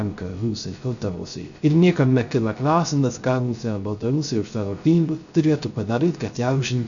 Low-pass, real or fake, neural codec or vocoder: 7.2 kHz; fake; codec, 16 kHz, 0.3 kbps, FocalCodec